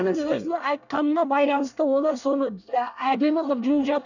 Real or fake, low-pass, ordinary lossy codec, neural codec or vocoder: fake; 7.2 kHz; none; codec, 24 kHz, 1 kbps, SNAC